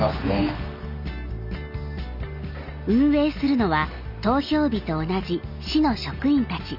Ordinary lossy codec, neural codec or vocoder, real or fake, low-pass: none; none; real; 5.4 kHz